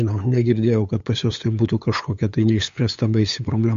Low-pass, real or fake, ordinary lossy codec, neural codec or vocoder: 7.2 kHz; fake; MP3, 48 kbps; codec, 16 kHz, 8 kbps, FunCodec, trained on LibriTTS, 25 frames a second